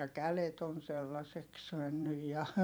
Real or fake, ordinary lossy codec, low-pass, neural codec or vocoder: fake; none; none; vocoder, 44.1 kHz, 128 mel bands every 512 samples, BigVGAN v2